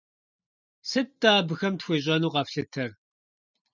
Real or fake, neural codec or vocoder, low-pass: real; none; 7.2 kHz